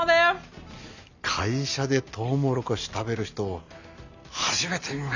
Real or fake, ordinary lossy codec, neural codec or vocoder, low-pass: real; none; none; 7.2 kHz